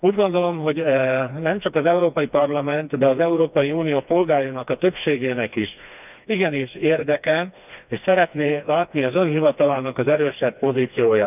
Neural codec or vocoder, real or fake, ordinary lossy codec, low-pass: codec, 16 kHz, 2 kbps, FreqCodec, smaller model; fake; none; 3.6 kHz